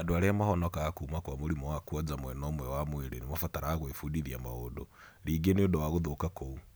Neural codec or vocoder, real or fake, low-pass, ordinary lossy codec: none; real; none; none